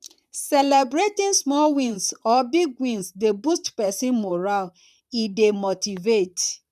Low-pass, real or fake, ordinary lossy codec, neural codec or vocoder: 14.4 kHz; fake; none; vocoder, 44.1 kHz, 128 mel bands, Pupu-Vocoder